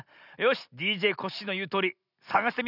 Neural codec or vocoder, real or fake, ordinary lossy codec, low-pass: none; real; none; 5.4 kHz